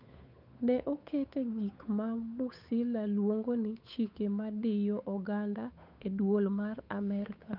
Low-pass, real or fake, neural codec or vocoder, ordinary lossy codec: 5.4 kHz; fake; codec, 16 kHz, 8 kbps, FunCodec, trained on Chinese and English, 25 frames a second; none